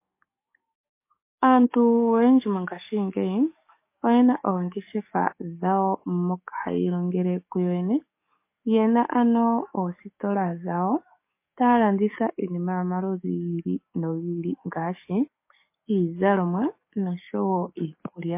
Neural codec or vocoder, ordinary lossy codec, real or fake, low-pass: codec, 44.1 kHz, 7.8 kbps, DAC; MP3, 24 kbps; fake; 3.6 kHz